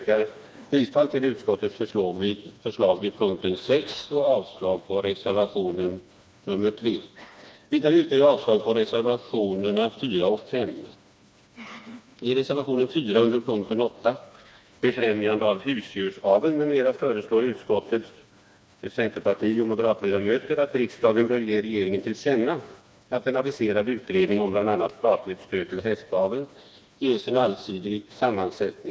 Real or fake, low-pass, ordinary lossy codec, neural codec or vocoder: fake; none; none; codec, 16 kHz, 2 kbps, FreqCodec, smaller model